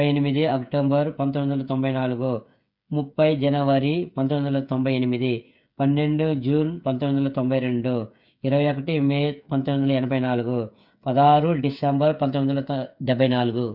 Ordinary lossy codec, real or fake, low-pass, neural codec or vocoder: none; fake; 5.4 kHz; codec, 16 kHz, 8 kbps, FreqCodec, smaller model